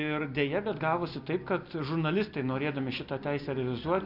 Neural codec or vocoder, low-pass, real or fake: none; 5.4 kHz; real